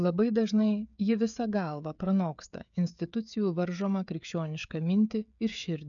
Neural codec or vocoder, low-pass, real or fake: codec, 16 kHz, 16 kbps, FreqCodec, smaller model; 7.2 kHz; fake